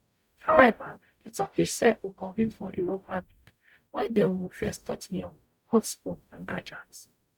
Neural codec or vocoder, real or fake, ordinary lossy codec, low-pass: codec, 44.1 kHz, 0.9 kbps, DAC; fake; none; 19.8 kHz